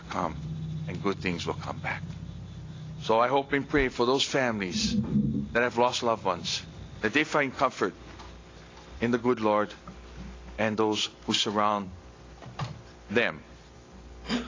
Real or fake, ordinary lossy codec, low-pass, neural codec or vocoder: real; AAC, 32 kbps; 7.2 kHz; none